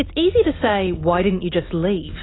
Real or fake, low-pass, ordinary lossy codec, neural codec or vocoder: real; 7.2 kHz; AAC, 16 kbps; none